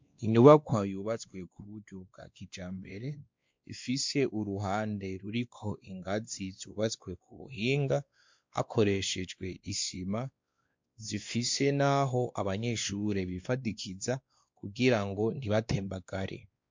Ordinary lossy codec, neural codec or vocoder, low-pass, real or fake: MP3, 64 kbps; codec, 16 kHz, 2 kbps, X-Codec, WavLM features, trained on Multilingual LibriSpeech; 7.2 kHz; fake